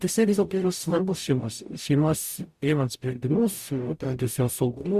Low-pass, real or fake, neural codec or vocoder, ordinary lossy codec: 14.4 kHz; fake; codec, 44.1 kHz, 0.9 kbps, DAC; Opus, 64 kbps